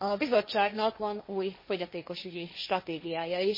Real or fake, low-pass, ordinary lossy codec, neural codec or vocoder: fake; 5.4 kHz; MP3, 24 kbps; codec, 16 kHz, 1.1 kbps, Voila-Tokenizer